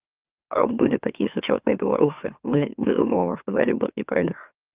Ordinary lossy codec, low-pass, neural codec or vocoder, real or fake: Opus, 24 kbps; 3.6 kHz; autoencoder, 44.1 kHz, a latent of 192 numbers a frame, MeloTTS; fake